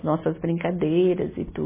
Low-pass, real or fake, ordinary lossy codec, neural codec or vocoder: 3.6 kHz; real; MP3, 16 kbps; none